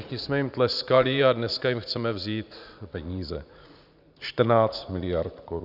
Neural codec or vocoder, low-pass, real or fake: vocoder, 44.1 kHz, 80 mel bands, Vocos; 5.4 kHz; fake